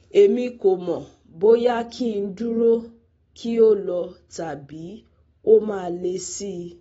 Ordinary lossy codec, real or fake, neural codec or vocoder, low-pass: AAC, 24 kbps; real; none; 19.8 kHz